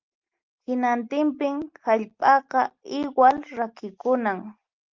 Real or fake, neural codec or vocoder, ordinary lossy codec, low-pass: real; none; Opus, 32 kbps; 7.2 kHz